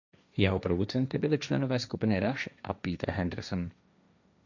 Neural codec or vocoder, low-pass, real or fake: codec, 16 kHz, 1.1 kbps, Voila-Tokenizer; 7.2 kHz; fake